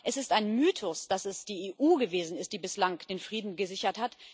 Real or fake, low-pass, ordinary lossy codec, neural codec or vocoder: real; none; none; none